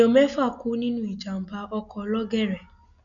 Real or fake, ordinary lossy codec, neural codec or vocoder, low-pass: real; none; none; 7.2 kHz